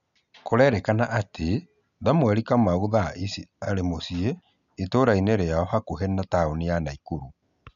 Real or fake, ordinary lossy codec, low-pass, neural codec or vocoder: real; none; 7.2 kHz; none